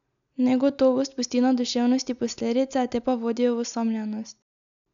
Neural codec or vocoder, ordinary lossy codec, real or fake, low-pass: none; none; real; 7.2 kHz